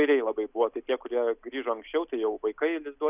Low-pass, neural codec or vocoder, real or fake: 3.6 kHz; none; real